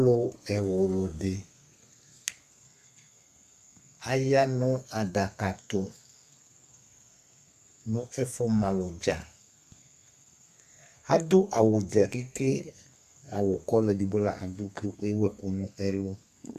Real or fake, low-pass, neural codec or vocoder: fake; 14.4 kHz; codec, 32 kHz, 1.9 kbps, SNAC